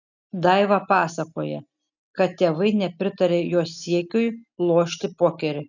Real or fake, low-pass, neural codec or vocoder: real; 7.2 kHz; none